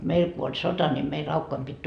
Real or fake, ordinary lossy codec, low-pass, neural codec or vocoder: real; none; 9.9 kHz; none